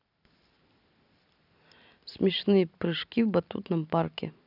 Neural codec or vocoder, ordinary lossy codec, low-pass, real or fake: none; none; 5.4 kHz; real